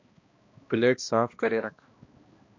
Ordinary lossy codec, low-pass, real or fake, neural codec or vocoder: MP3, 48 kbps; 7.2 kHz; fake; codec, 16 kHz, 1 kbps, X-Codec, HuBERT features, trained on balanced general audio